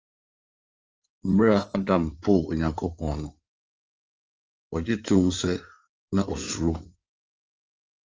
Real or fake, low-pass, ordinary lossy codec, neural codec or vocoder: fake; 7.2 kHz; Opus, 24 kbps; codec, 16 kHz in and 24 kHz out, 2.2 kbps, FireRedTTS-2 codec